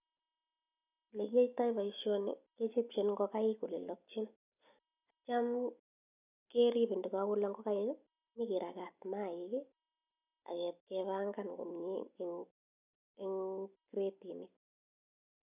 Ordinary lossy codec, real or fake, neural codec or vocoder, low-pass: none; real; none; 3.6 kHz